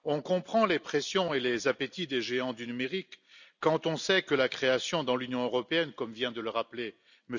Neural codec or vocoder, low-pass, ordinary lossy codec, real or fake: none; 7.2 kHz; none; real